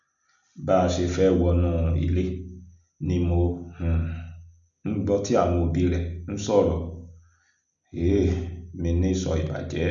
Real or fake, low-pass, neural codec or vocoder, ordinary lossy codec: real; 7.2 kHz; none; none